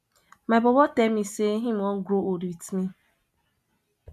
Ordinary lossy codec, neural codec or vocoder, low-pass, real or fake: none; none; 14.4 kHz; real